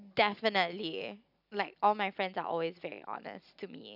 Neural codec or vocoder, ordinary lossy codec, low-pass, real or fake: none; none; 5.4 kHz; real